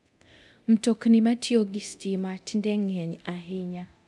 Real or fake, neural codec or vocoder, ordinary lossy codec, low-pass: fake; codec, 24 kHz, 0.9 kbps, DualCodec; none; none